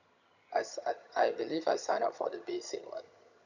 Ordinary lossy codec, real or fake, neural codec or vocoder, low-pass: none; fake; vocoder, 22.05 kHz, 80 mel bands, HiFi-GAN; 7.2 kHz